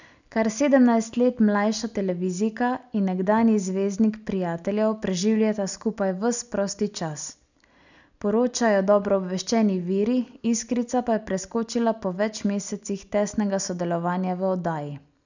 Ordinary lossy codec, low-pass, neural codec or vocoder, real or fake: none; 7.2 kHz; none; real